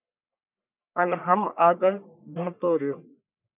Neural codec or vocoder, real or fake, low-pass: codec, 44.1 kHz, 1.7 kbps, Pupu-Codec; fake; 3.6 kHz